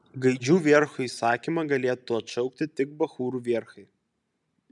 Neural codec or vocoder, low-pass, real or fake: none; 10.8 kHz; real